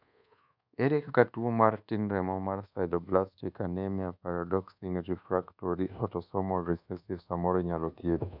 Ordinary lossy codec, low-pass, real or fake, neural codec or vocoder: none; 5.4 kHz; fake; codec, 24 kHz, 1.2 kbps, DualCodec